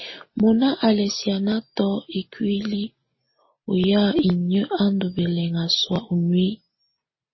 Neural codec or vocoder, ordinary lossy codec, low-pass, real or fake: none; MP3, 24 kbps; 7.2 kHz; real